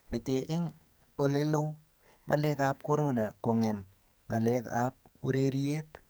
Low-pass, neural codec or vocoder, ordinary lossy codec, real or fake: none; codec, 44.1 kHz, 2.6 kbps, SNAC; none; fake